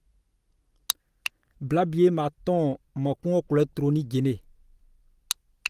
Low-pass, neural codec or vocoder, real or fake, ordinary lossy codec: 14.4 kHz; none; real; Opus, 24 kbps